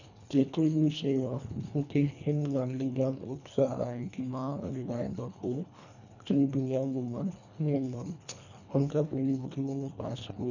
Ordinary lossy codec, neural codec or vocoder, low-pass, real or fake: none; codec, 24 kHz, 1.5 kbps, HILCodec; 7.2 kHz; fake